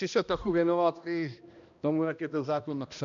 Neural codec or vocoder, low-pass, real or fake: codec, 16 kHz, 1 kbps, X-Codec, HuBERT features, trained on balanced general audio; 7.2 kHz; fake